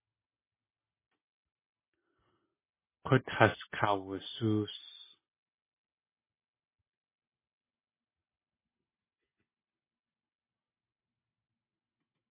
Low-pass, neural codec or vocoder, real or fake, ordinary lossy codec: 3.6 kHz; none; real; MP3, 16 kbps